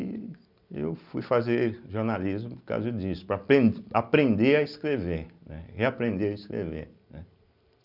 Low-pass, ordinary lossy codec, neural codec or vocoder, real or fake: 5.4 kHz; none; none; real